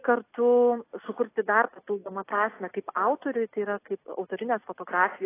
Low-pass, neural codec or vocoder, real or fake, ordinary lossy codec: 3.6 kHz; none; real; AAC, 24 kbps